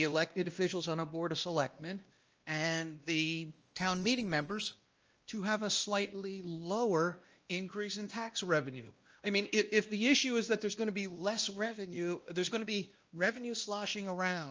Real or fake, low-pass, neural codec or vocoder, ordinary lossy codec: fake; 7.2 kHz; codec, 16 kHz, 1 kbps, X-Codec, WavLM features, trained on Multilingual LibriSpeech; Opus, 24 kbps